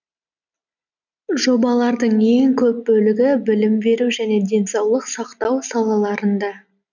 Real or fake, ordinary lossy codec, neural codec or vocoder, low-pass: real; none; none; 7.2 kHz